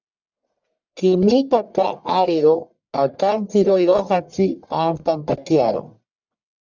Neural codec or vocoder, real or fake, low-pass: codec, 44.1 kHz, 1.7 kbps, Pupu-Codec; fake; 7.2 kHz